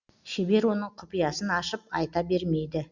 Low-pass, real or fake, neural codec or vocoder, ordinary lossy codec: 7.2 kHz; real; none; Opus, 64 kbps